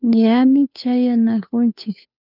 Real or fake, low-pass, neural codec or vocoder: fake; 5.4 kHz; codec, 16 kHz, 2 kbps, FunCodec, trained on Chinese and English, 25 frames a second